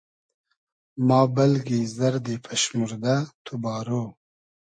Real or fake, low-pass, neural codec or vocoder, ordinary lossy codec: real; 9.9 kHz; none; MP3, 48 kbps